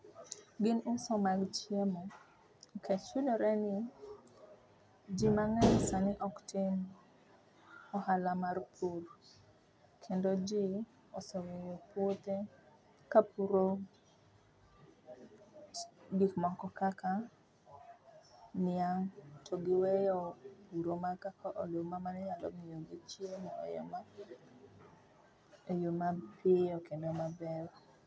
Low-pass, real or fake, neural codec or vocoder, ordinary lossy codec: none; real; none; none